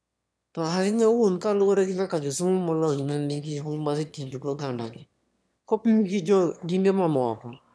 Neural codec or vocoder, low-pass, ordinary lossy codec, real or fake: autoencoder, 22.05 kHz, a latent of 192 numbers a frame, VITS, trained on one speaker; none; none; fake